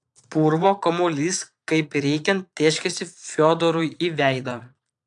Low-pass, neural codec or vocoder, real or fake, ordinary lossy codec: 9.9 kHz; vocoder, 22.05 kHz, 80 mel bands, Vocos; fake; AAC, 64 kbps